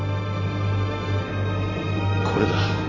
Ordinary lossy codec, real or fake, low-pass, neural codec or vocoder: none; real; 7.2 kHz; none